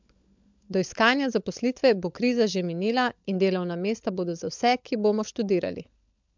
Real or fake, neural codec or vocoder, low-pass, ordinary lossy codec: fake; codec, 16 kHz, 16 kbps, FunCodec, trained on LibriTTS, 50 frames a second; 7.2 kHz; MP3, 64 kbps